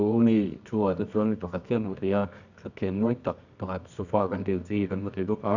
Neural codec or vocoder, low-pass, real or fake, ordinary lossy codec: codec, 24 kHz, 0.9 kbps, WavTokenizer, medium music audio release; 7.2 kHz; fake; none